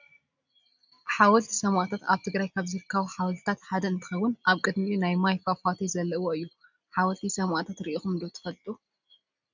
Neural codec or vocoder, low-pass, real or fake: none; 7.2 kHz; real